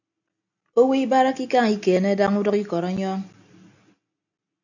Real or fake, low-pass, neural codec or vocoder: real; 7.2 kHz; none